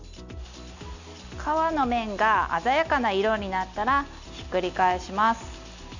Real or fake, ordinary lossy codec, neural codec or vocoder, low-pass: real; none; none; 7.2 kHz